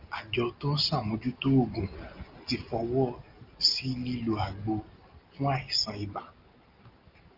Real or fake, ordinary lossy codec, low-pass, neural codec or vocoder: real; Opus, 24 kbps; 5.4 kHz; none